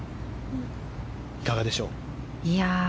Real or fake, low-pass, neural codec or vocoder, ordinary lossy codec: real; none; none; none